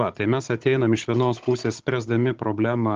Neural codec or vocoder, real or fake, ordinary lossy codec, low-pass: codec, 16 kHz, 16 kbps, FunCodec, trained on Chinese and English, 50 frames a second; fake; Opus, 16 kbps; 7.2 kHz